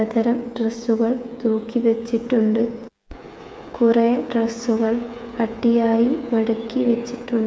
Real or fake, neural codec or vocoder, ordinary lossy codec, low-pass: fake; codec, 16 kHz, 8 kbps, FreqCodec, smaller model; none; none